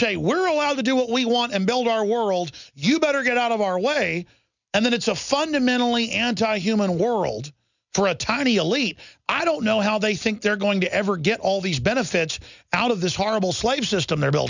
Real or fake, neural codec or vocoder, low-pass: real; none; 7.2 kHz